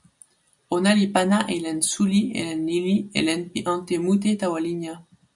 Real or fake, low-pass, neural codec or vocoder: real; 10.8 kHz; none